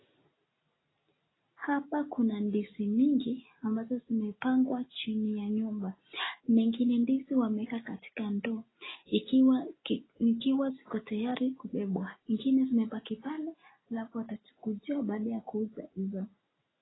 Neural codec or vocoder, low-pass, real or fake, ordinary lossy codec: none; 7.2 kHz; real; AAC, 16 kbps